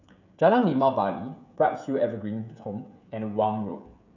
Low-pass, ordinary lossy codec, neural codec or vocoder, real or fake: 7.2 kHz; none; codec, 16 kHz, 16 kbps, FreqCodec, smaller model; fake